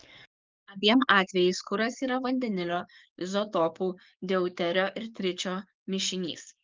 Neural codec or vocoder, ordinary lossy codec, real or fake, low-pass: codec, 44.1 kHz, 7.8 kbps, DAC; Opus, 32 kbps; fake; 7.2 kHz